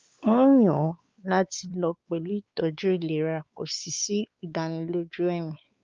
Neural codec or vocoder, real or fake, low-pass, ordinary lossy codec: codec, 16 kHz, 4 kbps, X-Codec, WavLM features, trained on Multilingual LibriSpeech; fake; 7.2 kHz; Opus, 32 kbps